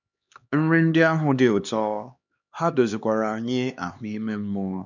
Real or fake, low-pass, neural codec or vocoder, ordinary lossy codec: fake; 7.2 kHz; codec, 16 kHz, 2 kbps, X-Codec, HuBERT features, trained on LibriSpeech; none